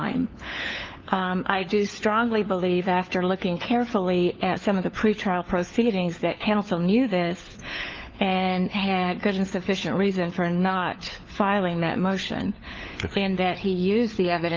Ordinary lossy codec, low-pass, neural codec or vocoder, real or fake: Opus, 24 kbps; 7.2 kHz; codec, 16 kHz, 4 kbps, FunCodec, trained on LibriTTS, 50 frames a second; fake